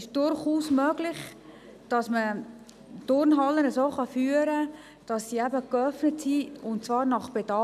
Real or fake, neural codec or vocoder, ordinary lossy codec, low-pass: real; none; none; 14.4 kHz